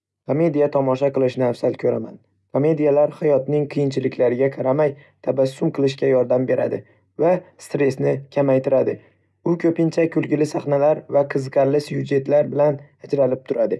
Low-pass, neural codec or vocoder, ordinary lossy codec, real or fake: none; none; none; real